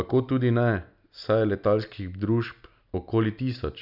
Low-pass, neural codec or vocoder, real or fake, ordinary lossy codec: 5.4 kHz; none; real; none